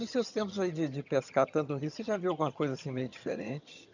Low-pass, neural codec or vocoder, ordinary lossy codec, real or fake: 7.2 kHz; vocoder, 22.05 kHz, 80 mel bands, HiFi-GAN; none; fake